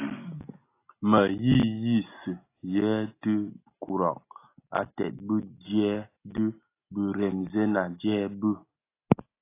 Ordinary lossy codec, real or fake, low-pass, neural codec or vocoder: AAC, 24 kbps; real; 3.6 kHz; none